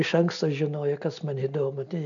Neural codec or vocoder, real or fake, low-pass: none; real; 7.2 kHz